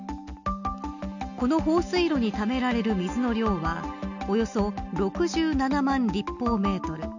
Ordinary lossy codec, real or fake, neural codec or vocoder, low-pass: none; real; none; 7.2 kHz